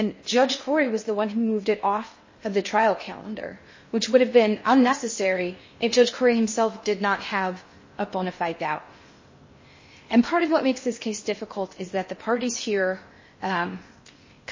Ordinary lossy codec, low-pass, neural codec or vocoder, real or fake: MP3, 32 kbps; 7.2 kHz; codec, 16 kHz in and 24 kHz out, 0.6 kbps, FocalCodec, streaming, 2048 codes; fake